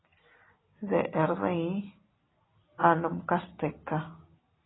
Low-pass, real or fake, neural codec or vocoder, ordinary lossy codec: 7.2 kHz; real; none; AAC, 16 kbps